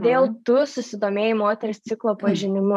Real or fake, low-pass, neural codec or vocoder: fake; 14.4 kHz; vocoder, 44.1 kHz, 128 mel bands every 512 samples, BigVGAN v2